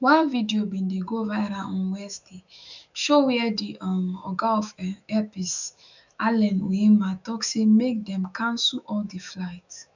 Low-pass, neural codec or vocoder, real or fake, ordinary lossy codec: 7.2 kHz; autoencoder, 48 kHz, 128 numbers a frame, DAC-VAE, trained on Japanese speech; fake; none